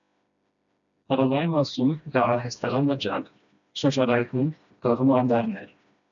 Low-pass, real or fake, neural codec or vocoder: 7.2 kHz; fake; codec, 16 kHz, 1 kbps, FreqCodec, smaller model